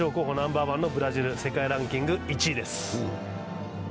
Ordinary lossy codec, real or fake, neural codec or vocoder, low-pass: none; real; none; none